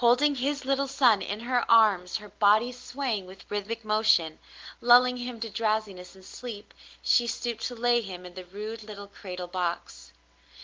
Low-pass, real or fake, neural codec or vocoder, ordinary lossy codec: 7.2 kHz; real; none; Opus, 16 kbps